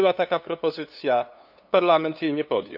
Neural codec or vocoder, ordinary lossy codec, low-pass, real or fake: codec, 16 kHz, 2 kbps, FunCodec, trained on LibriTTS, 25 frames a second; none; 5.4 kHz; fake